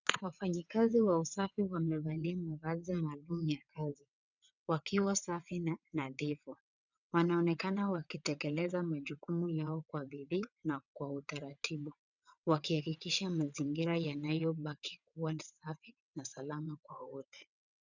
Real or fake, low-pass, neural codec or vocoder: fake; 7.2 kHz; vocoder, 22.05 kHz, 80 mel bands, WaveNeXt